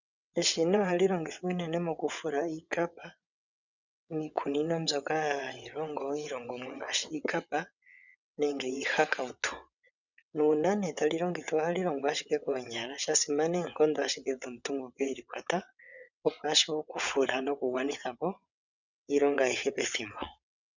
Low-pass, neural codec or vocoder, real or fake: 7.2 kHz; vocoder, 22.05 kHz, 80 mel bands, WaveNeXt; fake